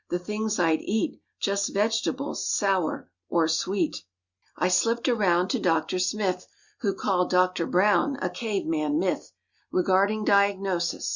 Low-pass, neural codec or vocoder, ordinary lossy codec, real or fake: 7.2 kHz; none; Opus, 64 kbps; real